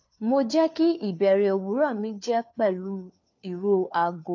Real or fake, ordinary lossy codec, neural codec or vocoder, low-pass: fake; AAC, 48 kbps; codec, 16 kHz, 4 kbps, FunCodec, trained on LibriTTS, 50 frames a second; 7.2 kHz